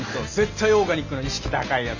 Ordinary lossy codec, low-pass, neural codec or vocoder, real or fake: none; 7.2 kHz; none; real